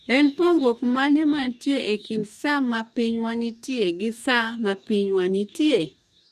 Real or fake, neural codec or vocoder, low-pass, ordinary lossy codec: fake; codec, 44.1 kHz, 2.6 kbps, DAC; 14.4 kHz; none